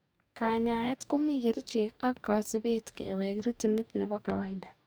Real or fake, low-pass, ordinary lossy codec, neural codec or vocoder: fake; none; none; codec, 44.1 kHz, 2.6 kbps, DAC